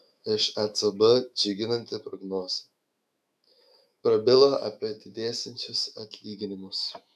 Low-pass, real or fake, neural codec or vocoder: 14.4 kHz; fake; autoencoder, 48 kHz, 128 numbers a frame, DAC-VAE, trained on Japanese speech